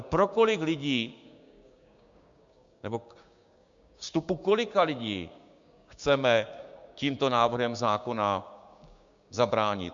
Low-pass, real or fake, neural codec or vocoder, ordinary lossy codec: 7.2 kHz; fake; codec, 16 kHz, 6 kbps, DAC; MP3, 64 kbps